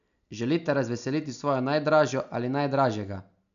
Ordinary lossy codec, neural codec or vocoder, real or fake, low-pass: none; none; real; 7.2 kHz